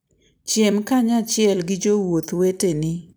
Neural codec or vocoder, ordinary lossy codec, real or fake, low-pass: vocoder, 44.1 kHz, 128 mel bands every 256 samples, BigVGAN v2; none; fake; none